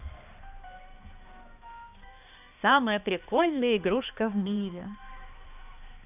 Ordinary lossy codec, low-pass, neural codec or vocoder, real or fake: none; 3.6 kHz; codec, 16 kHz, 2 kbps, X-Codec, HuBERT features, trained on balanced general audio; fake